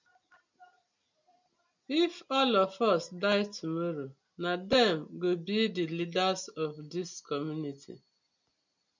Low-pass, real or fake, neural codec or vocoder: 7.2 kHz; real; none